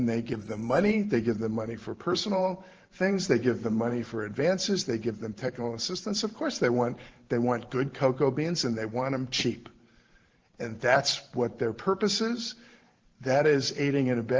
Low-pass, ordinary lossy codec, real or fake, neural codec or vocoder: 7.2 kHz; Opus, 16 kbps; fake; vocoder, 44.1 kHz, 128 mel bands every 512 samples, BigVGAN v2